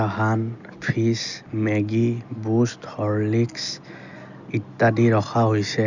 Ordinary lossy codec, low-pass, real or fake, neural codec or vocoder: none; 7.2 kHz; real; none